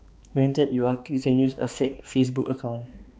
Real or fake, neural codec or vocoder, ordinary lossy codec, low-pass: fake; codec, 16 kHz, 2 kbps, X-Codec, HuBERT features, trained on balanced general audio; none; none